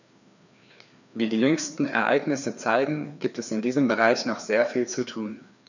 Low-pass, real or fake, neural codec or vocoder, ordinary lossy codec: 7.2 kHz; fake; codec, 16 kHz, 2 kbps, FreqCodec, larger model; none